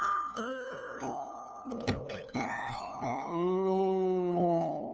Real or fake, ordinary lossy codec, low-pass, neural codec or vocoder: fake; none; none; codec, 16 kHz, 2 kbps, FunCodec, trained on LibriTTS, 25 frames a second